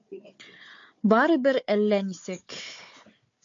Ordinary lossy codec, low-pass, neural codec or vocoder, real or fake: MP3, 48 kbps; 7.2 kHz; codec, 16 kHz, 4 kbps, FunCodec, trained on Chinese and English, 50 frames a second; fake